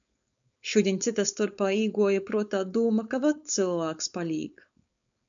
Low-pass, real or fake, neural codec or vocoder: 7.2 kHz; fake; codec, 16 kHz, 4.8 kbps, FACodec